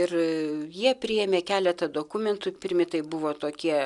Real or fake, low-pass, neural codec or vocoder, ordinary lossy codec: real; 10.8 kHz; none; MP3, 96 kbps